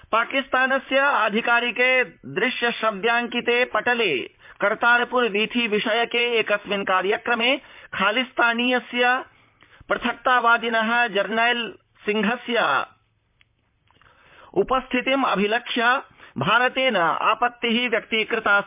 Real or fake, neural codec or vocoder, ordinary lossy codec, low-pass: fake; vocoder, 44.1 kHz, 128 mel bands, Pupu-Vocoder; MP3, 32 kbps; 3.6 kHz